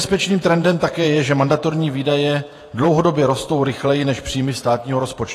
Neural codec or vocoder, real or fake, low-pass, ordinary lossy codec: vocoder, 48 kHz, 128 mel bands, Vocos; fake; 14.4 kHz; AAC, 48 kbps